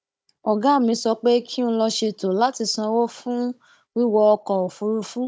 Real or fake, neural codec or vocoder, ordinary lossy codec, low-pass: fake; codec, 16 kHz, 16 kbps, FunCodec, trained on Chinese and English, 50 frames a second; none; none